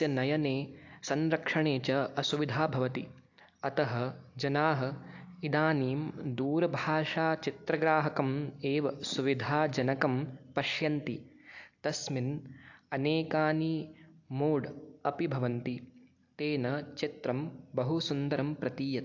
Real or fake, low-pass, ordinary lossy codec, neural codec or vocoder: real; 7.2 kHz; AAC, 48 kbps; none